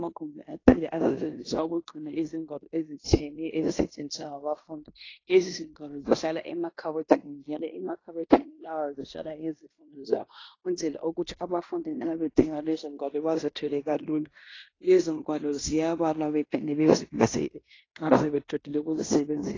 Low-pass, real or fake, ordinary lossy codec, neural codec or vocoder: 7.2 kHz; fake; AAC, 32 kbps; codec, 16 kHz in and 24 kHz out, 0.9 kbps, LongCat-Audio-Codec, fine tuned four codebook decoder